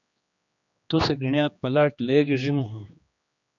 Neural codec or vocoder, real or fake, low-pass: codec, 16 kHz, 2 kbps, X-Codec, HuBERT features, trained on general audio; fake; 7.2 kHz